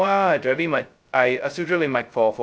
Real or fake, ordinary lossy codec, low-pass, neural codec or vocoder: fake; none; none; codec, 16 kHz, 0.2 kbps, FocalCodec